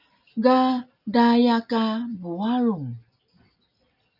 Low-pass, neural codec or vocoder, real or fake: 5.4 kHz; none; real